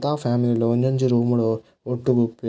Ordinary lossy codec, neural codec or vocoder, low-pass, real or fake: none; none; none; real